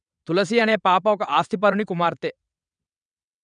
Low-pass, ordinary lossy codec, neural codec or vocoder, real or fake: 9.9 kHz; none; vocoder, 22.05 kHz, 80 mel bands, WaveNeXt; fake